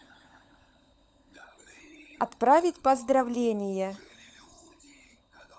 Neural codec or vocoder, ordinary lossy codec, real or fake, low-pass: codec, 16 kHz, 16 kbps, FunCodec, trained on LibriTTS, 50 frames a second; none; fake; none